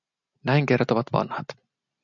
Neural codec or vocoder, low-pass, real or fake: none; 7.2 kHz; real